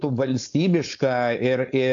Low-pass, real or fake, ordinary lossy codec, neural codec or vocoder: 7.2 kHz; fake; AAC, 64 kbps; codec, 16 kHz, 4.8 kbps, FACodec